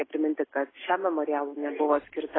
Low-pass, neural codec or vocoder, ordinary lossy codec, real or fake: 7.2 kHz; none; AAC, 16 kbps; real